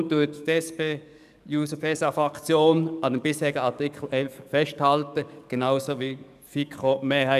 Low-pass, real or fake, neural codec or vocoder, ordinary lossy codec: 14.4 kHz; fake; codec, 44.1 kHz, 7.8 kbps, DAC; none